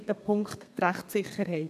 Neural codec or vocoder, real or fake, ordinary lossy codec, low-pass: codec, 32 kHz, 1.9 kbps, SNAC; fake; none; 14.4 kHz